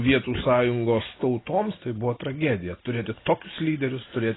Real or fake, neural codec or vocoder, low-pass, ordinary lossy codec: real; none; 7.2 kHz; AAC, 16 kbps